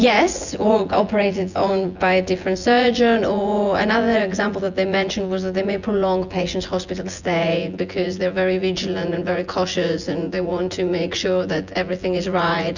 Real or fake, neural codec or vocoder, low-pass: fake; vocoder, 24 kHz, 100 mel bands, Vocos; 7.2 kHz